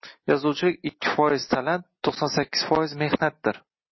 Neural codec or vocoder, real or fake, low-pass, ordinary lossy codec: none; real; 7.2 kHz; MP3, 24 kbps